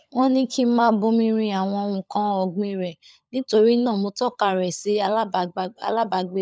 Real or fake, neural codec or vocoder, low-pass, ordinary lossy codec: fake; codec, 16 kHz, 16 kbps, FunCodec, trained on LibriTTS, 50 frames a second; none; none